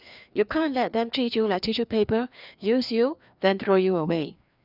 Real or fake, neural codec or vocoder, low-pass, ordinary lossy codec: fake; codec, 16 kHz, 2 kbps, FreqCodec, larger model; 5.4 kHz; none